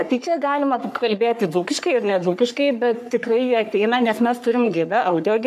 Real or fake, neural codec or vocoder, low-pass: fake; codec, 44.1 kHz, 3.4 kbps, Pupu-Codec; 14.4 kHz